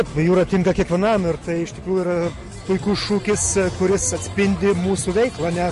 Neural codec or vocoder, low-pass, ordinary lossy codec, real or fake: none; 14.4 kHz; MP3, 48 kbps; real